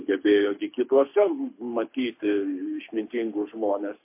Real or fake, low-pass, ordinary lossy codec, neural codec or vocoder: fake; 3.6 kHz; MP3, 24 kbps; codec, 24 kHz, 6 kbps, HILCodec